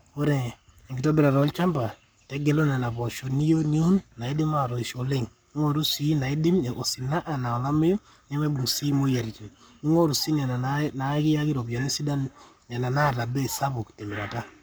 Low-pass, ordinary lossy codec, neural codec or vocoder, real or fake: none; none; codec, 44.1 kHz, 7.8 kbps, Pupu-Codec; fake